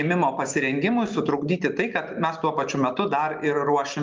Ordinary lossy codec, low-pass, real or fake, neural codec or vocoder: Opus, 24 kbps; 7.2 kHz; real; none